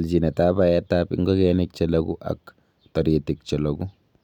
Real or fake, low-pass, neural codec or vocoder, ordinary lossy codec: real; 19.8 kHz; none; none